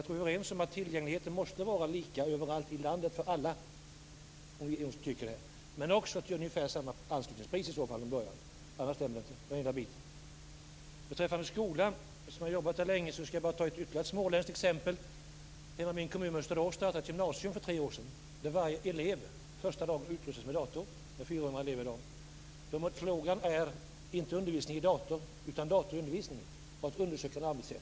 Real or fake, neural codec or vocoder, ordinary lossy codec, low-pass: real; none; none; none